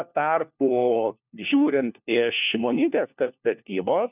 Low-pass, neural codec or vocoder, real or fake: 3.6 kHz; codec, 16 kHz, 1 kbps, FunCodec, trained on LibriTTS, 50 frames a second; fake